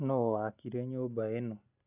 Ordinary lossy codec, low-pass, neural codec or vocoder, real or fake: none; 3.6 kHz; none; real